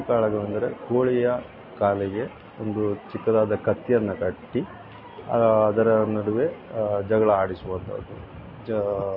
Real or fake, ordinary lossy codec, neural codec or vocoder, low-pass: real; MP3, 24 kbps; none; 5.4 kHz